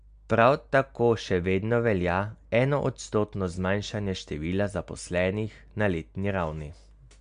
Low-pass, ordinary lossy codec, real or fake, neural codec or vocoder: 9.9 kHz; MP3, 64 kbps; real; none